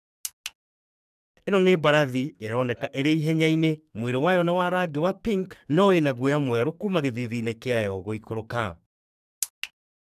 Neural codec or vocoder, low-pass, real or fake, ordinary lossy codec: codec, 44.1 kHz, 2.6 kbps, SNAC; 14.4 kHz; fake; none